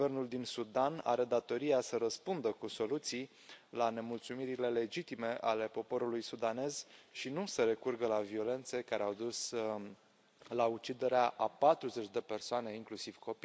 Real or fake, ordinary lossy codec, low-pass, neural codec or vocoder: real; none; none; none